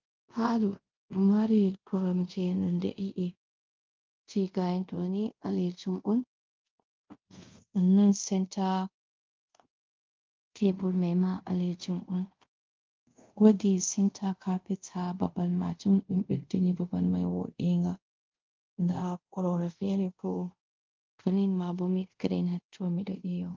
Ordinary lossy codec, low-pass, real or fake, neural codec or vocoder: Opus, 32 kbps; 7.2 kHz; fake; codec, 24 kHz, 0.5 kbps, DualCodec